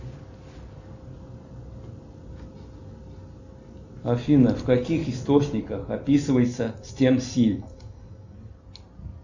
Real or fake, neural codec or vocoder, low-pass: real; none; 7.2 kHz